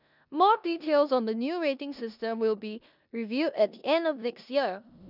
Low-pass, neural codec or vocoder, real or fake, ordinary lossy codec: 5.4 kHz; codec, 16 kHz in and 24 kHz out, 0.9 kbps, LongCat-Audio-Codec, four codebook decoder; fake; none